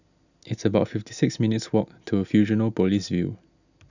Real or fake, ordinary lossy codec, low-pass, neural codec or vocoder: real; none; 7.2 kHz; none